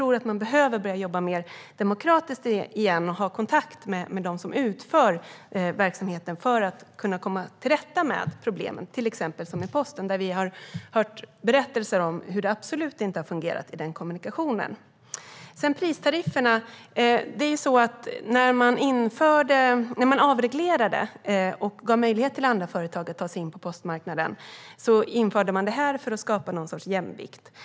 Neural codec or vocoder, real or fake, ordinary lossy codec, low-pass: none; real; none; none